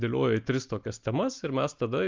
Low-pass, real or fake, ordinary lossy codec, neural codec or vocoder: 7.2 kHz; real; Opus, 24 kbps; none